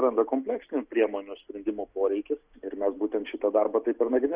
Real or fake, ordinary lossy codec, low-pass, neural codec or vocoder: real; Opus, 64 kbps; 3.6 kHz; none